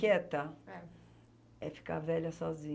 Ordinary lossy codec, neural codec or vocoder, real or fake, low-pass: none; none; real; none